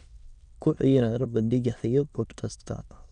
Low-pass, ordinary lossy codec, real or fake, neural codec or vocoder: 9.9 kHz; none; fake; autoencoder, 22.05 kHz, a latent of 192 numbers a frame, VITS, trained on many speakers